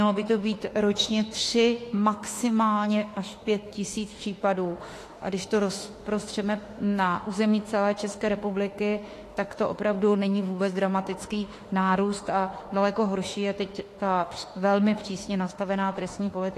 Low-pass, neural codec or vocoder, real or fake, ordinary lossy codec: 14.4 kHz; autoencoder, 48 kHz, 32 numbers a frame, DAC-VAE, trained on Japanese speech; fake; AAC, 48 kbps